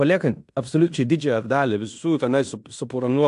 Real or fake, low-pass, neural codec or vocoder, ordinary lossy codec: fake; 10.8 kHz; codec, 16 kHz in and 24 kHz out, 0.9 kbps, LongCat-Audio-Codec, fine tuned four codebook decoder; AAC, 64 kbps